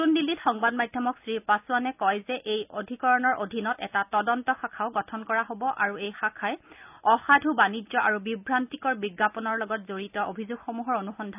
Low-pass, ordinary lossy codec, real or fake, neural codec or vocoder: 3.6 kHz; none; real; none